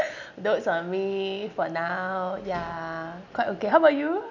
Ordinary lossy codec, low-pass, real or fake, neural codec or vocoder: none; 7.2 kHz; real; none